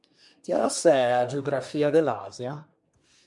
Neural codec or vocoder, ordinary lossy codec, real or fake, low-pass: codec, 24 kHz, 1 kbps, SNAC; MP3, 64 kbps; fake; 10.8 kHz